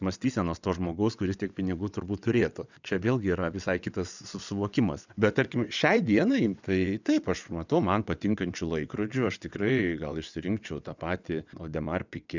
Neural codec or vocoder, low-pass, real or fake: vocoder, 22.05 kHz, 80 mel bands, WaveNeXt; 7.2 kHz; fake